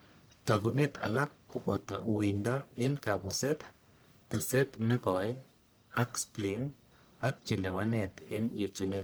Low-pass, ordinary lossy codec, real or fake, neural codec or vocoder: none; none; fake; codec, 44.1 kHz, 1.7 kbps, Pupu-Codec